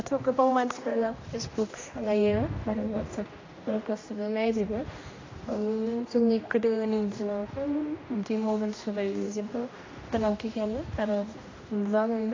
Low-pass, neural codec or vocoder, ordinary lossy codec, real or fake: 7.2 kHz; codec, 16 kHz, 1 kbps, X-Codec, HuBERT features, trained on general audio; AAC, 32 kbps; fake